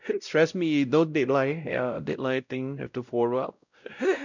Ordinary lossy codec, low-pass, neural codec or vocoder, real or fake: none; 7.2 kHz; codec, 16 kHz, 0.5 kbps, X-Codec, WavLM features, trained on Multilingual LibriSpeech; fake